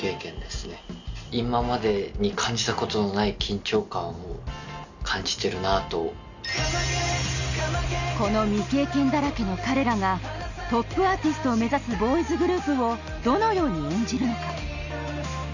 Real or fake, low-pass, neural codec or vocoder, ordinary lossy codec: real; 7.2 kHz; none; none